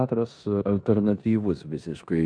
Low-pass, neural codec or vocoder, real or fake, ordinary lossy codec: 9.9 kHz; codec, 16 kHz in and 24 kHz out, 0.9 kbps, LongCat-Audio-Codec, four codebook decoder; fake; AAC, 64 kbps